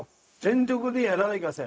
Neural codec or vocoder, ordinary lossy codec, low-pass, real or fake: codec, 16 kHz, 0.4 kbps, LongCat-Audio-Codec; none; none; fake